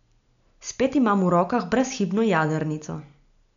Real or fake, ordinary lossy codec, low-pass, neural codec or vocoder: real; none; 7.2 kHz; none